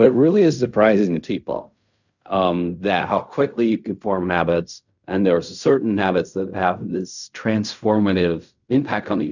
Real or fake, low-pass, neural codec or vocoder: fake; 7.2 kHz; codec, 16 kHz in and 24 kHz out, 0.4 kbps, LongCat-Audio-Codec, fine tuned four codebook decoder